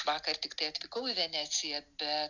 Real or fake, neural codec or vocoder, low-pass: real; none; 7.2 kHz